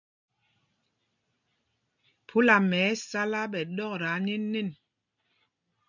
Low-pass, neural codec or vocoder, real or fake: 7.2 kHz; none; real